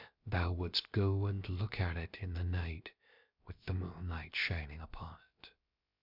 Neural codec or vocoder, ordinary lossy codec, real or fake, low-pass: codec, 16 kHz, about 1 kbps, DyCAST, with the encoder's durations; MP3, 48 kbps; fake; 5.4 kHz